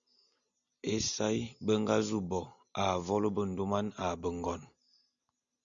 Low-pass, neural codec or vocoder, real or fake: 7.2 kHz; none; real